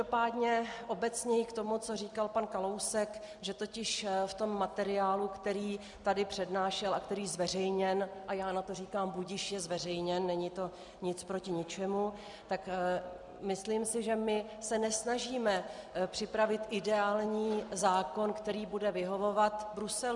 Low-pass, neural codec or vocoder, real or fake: 10.8 kHz; none; real